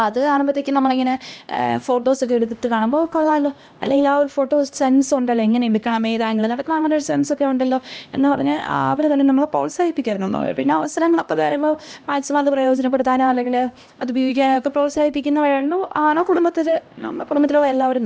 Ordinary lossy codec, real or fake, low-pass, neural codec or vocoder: none; fake; none; codec, 16 kHz, 1 kbps, X-Codec, HuBERT features, trained on LibriSpeech